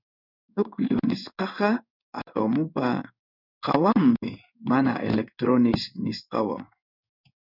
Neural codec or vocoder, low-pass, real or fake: codec, 16 kHz in and 24 kHz out, 1 kbps, XY-Tokenizer; 5.4 kHz; fake